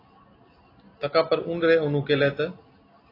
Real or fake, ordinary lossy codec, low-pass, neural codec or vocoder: real; AAC, 24 kbps; 5.4 kHz; none